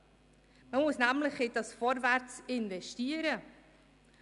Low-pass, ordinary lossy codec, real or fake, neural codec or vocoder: 10.8 kHz; none; real; none